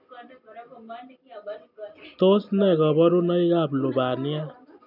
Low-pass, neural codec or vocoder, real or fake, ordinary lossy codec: 5.4 kHz; none; real; none